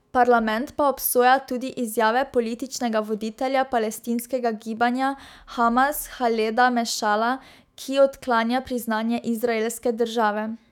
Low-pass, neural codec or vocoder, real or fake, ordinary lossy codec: 19.8 kHz; autoencoder, 48 kHz, 128 numbers a frame, DAC-VAE, trained on Japanese speech; fake; none